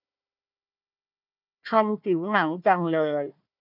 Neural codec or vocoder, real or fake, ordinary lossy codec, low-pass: codec, 16 kHz, 1 kbps, FunCodec, trained on Chinese and English, 50 frames a second; fake; none; 5.4 kHz